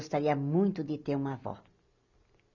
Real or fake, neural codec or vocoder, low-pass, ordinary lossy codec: real; none; 7.2 kHz; none